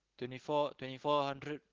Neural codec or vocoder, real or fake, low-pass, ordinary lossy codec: none; real; 7.2 kHz; Opus, 16 kbps